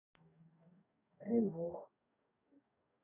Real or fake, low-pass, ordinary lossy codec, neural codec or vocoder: fake; 3.6 kHz; none; codec, 44.1 kHz, 2.6 kbps, DAC